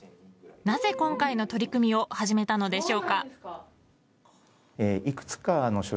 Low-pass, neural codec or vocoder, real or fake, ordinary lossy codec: none; none; real; none